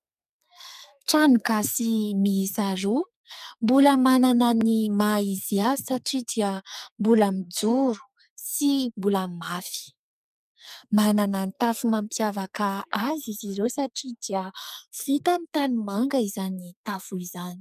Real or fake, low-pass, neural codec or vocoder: fake; 14.4 kHz; codec, 44.1 kHz, 2.6 kbps, SNAC